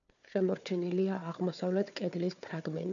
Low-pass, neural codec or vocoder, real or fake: 7.2 kHz; codec, 16 kHz, 4 kbps, FunCodec, trained on Chinese and English, 50 frames a second; fake